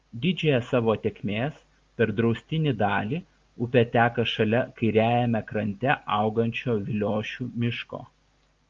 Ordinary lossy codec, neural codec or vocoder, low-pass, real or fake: Opus, 32 kbps; none; 7.2 kHz; real